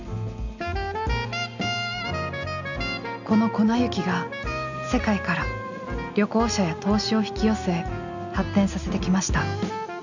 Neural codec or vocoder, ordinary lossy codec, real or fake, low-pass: none; none; real; 7.2 kHz